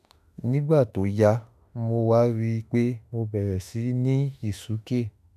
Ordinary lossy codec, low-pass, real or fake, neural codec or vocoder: none; 14.4 kHz; fake; autoencoder, 48 kHz, 32 numbers a frame, DAC-VAE, trained on Japanese speech